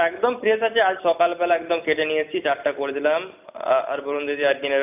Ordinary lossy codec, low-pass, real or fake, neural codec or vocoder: none; 3.6 kHz; real; none